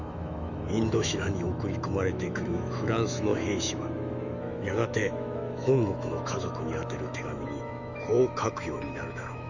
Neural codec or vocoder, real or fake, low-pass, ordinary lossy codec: autoencoder, 48 kHz, 128 numbers a frame, DAC-VAE, trained on Japanese speech; fake; 7.2 kHz; none